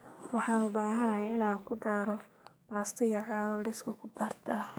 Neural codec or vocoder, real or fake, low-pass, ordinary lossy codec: codec, 44.1 kHz, 2.6 kbps, SNAC; fake; none; none